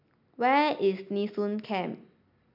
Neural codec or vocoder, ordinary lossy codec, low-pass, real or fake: none; none; 5.4 kHz; real